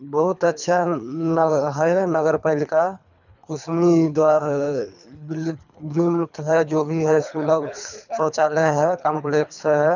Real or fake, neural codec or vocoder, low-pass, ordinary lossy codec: fake; codec, 24 kHz, 3 kbps, HILCodec; 7.2 kHz; none